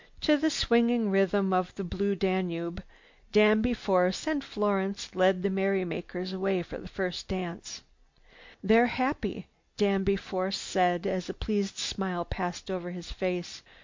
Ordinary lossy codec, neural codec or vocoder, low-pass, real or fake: MP3, 48 kbps; none; 7.2 kHz; real